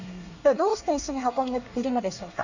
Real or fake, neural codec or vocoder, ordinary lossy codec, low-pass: fake; codec, 24 kHz, 1 kbps, SNAC; none; 7.2 kHz